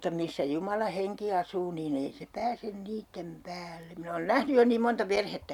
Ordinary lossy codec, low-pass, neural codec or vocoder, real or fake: none; 19.8 kHz; none; real